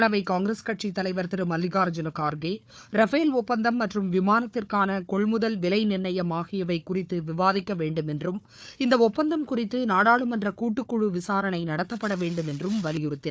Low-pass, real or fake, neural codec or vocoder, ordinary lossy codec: none; fake; codec, 16 kHz, 4 kbps, FunCodec, trained on Chinese and English, 50 frames a second; none